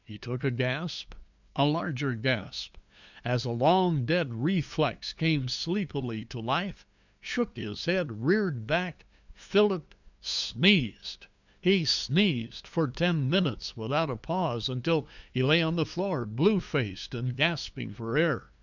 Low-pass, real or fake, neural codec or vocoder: 7.2 kHz; fake; codec, 16 kHz, 2 kbps, FunCodec, trained on LibriTTS, 25 frames a second